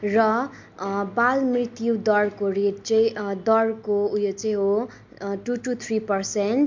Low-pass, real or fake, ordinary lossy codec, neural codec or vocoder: 7.2 kHz; real; MP3, 48 kbps; none